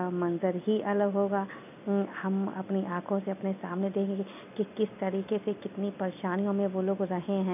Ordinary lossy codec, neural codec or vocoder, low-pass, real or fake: none; none; 3.6 kHz; real